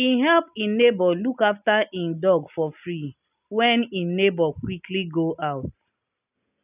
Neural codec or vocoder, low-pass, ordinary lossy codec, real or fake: none; 3.6 kHz; none; real